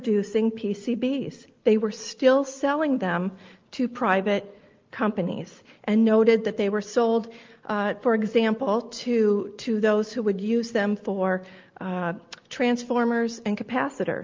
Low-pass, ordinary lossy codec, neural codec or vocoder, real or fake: 7.2 kHz; Opus, 32 kbps; none; real